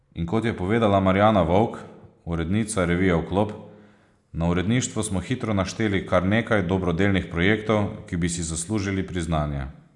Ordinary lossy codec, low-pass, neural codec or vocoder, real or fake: none; 10.8 kHz; none; real